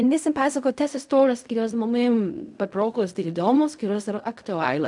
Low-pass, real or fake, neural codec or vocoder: 10.8 kHz; fake; codec, 16 kHz in and 24 kHz out, 0.4 kbps, LongCat-Audio-Codec, fine tuned four codebook decoder